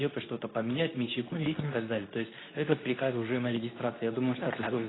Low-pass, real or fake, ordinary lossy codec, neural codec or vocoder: 7.2 kHz; fake; AAC, 16 kbps; codec, 24 kHz, 0.9 kbps, WavTokenizer, medium speech release version 2